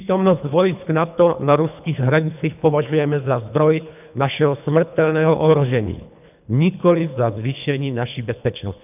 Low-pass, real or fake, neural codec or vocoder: 3.6 kHz; fake; codec, 24 kHz, 3 kbps, HILCodec